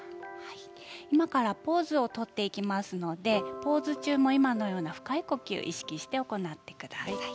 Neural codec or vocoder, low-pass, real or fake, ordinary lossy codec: none; none; real; none